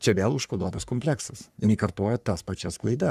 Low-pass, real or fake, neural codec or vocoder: 14.4 kHz; fake; codec, 44.1 kHz, 3.4 kbps, Pupu-Codec